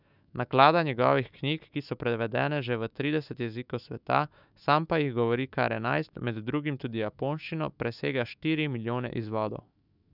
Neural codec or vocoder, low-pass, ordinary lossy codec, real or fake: autoencoder, 48 kHz, 128 numbers a frame, DAC-VAE, trained on Japanese speech; 5.4 kHz; none; fake